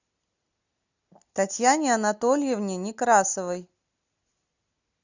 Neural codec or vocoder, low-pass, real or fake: none; 7.2 kHz; real